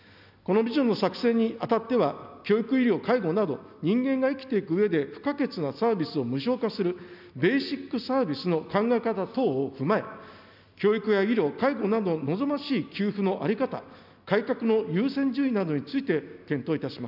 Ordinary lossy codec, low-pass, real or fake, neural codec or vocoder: none; 5.4 kHz; real; none